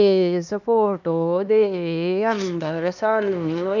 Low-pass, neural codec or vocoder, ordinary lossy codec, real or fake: 7.2 kHz; codec, 16 kHz, 2 kbps, X-Codec, HuBERT features, trained on LibriSpeech; none; fake